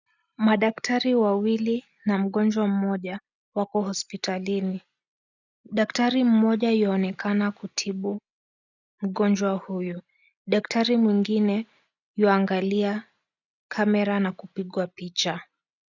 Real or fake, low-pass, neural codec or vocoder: real; 7.2 kHz; none